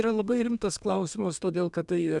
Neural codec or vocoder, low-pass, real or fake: codec, 32 kHz, 1.9 kbps, SNAC; 10.8 kHz; fake